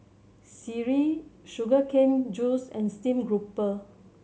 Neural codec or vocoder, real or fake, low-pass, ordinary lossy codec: none; real; none; none